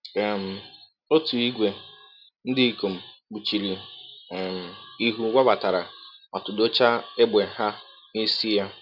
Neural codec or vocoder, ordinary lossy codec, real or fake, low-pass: none; none; real; 5.4 kHz